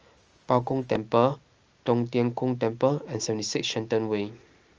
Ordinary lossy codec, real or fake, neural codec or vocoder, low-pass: Opus, 24 kbps; real; none; 7.2 kHz